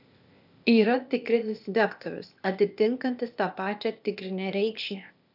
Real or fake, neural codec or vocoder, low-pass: fake; codec, 16 kHz, 0.8 kbps, ZipCodec; 5.4 kHz